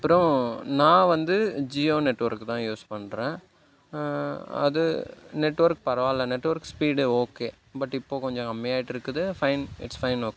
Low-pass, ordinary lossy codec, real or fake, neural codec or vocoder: none; none; real; none